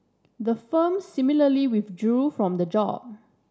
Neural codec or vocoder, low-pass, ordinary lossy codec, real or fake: none; none; none; real